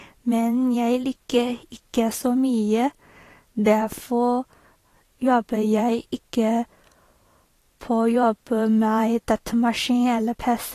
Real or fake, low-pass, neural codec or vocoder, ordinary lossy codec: fake; 14.4 kHz; vocoder, 44.1 kHz, 128 mel bands every 512 samples, BigVGAN v2; AAC, 48 kbps